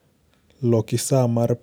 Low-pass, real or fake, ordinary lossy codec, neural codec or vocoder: none; real; none; none